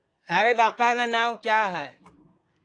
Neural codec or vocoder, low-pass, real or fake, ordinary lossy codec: codec, 24 kHz, 1 kbps, SNAC; 9.9 kHz; fake; AAC, 48 kbps